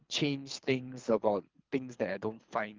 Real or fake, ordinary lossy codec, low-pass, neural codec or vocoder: fake; Opus, 32 kbps; 7.2 kHz; codec, 24 kHz, 3 kbps, HILCodec